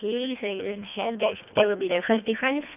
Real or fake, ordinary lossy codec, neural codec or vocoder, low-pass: fake; none; codec, 24 kHz, 1.5 kbps, HILCodec; 3.6 kHz